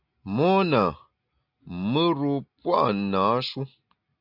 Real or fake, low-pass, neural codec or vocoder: real; 5.4 kHz; none